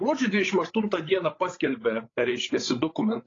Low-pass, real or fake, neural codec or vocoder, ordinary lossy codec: 7.2 kHz; fake; codec, 16 kHz, 8 kbps, FreqCodec, larger model; AAC, 32 kbps